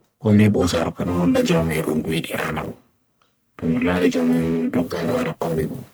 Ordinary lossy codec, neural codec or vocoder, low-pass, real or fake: none; codec, 44.1 kHz, 1.7 kbps, Pupu-Codec; none; fake